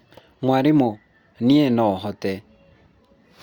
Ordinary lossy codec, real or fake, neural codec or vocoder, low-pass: Opus, 64 kbps; real; none; 19.8 kHz